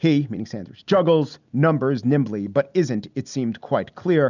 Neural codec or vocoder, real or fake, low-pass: none; real; 7.2 kHz